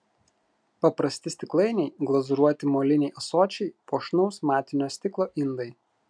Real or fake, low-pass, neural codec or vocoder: real; 9.9 kHz; none